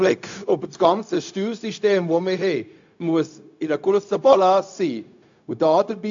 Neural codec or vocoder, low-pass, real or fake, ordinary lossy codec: codec, 16 kHz, 0.4 kbps, LongCat-Audio-Codec; 7.2 kHz; fake; AAC, 64 kbps